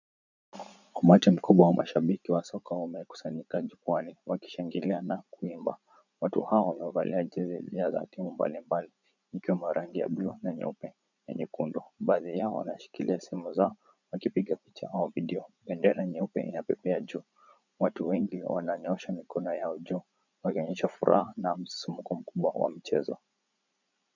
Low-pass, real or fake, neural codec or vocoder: 7.2 kHz; fake; vocoder, 44.1 kHz, 80 mel bands, Vocos